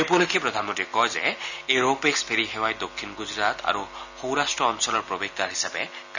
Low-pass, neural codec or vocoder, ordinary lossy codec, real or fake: 7.2 kHz; none; none; real